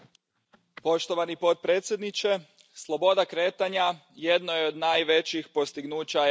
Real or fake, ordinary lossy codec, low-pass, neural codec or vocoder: real; none; none; none